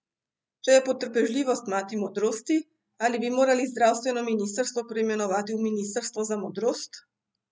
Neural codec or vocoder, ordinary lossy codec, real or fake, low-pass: none; none; real; none